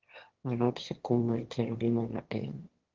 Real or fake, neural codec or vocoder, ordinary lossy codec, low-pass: fake; autoencoder, 22.05 kHz, a latent of 192 numbers a frame, VITS, trained on one speaker; Opus, 16 kbps; 7.2 kHz